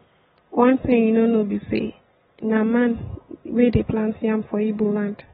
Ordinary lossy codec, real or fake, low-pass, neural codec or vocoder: AAC, 16 kbps; real; 7.2 kHz; none